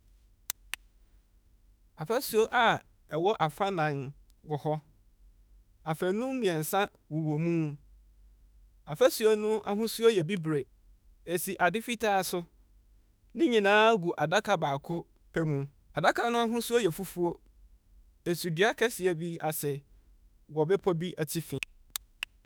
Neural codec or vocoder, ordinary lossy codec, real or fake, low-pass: autoencoder, 48 kHz, 32 numbers a frame, DAC-VAE, trained on Japanese speech; none; fake; none